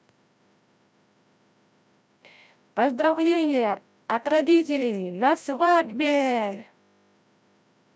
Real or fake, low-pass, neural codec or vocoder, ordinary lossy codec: fake; none; codec, 16 kHz, 0.5 kbps, FreqCodec, larger model; none